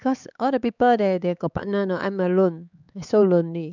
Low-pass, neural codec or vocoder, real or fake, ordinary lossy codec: 7.2 kHz; codec, 16 kHz, 4 kbps, X-Codec, HuBERT features, trained on LibriSpeech; fake; none